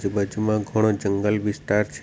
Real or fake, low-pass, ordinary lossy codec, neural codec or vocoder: real; none; none; none